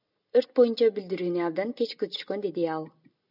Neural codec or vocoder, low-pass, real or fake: none; 5.4 kHz; real